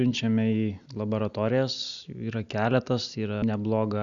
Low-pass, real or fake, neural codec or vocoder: 7.2 kHz; real; none